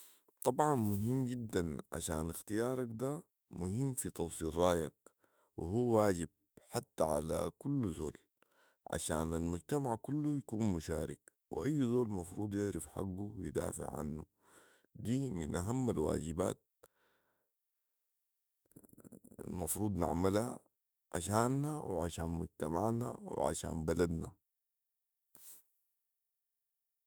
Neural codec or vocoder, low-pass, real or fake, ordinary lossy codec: autoencoder, 48 kHz, 32 numbers a frame, DAC-VAE, trained on Japanese speech; none; fake; none